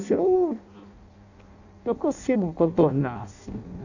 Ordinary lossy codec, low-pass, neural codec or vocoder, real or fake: none; 7.2 kHz; codec, 16 kHz in and 24 kHz out, 0.6 kbps, FireRedTTS-2 codec; fake